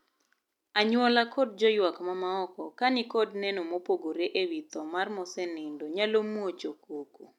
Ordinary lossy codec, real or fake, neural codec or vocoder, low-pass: none; real; none; 19.8 kHz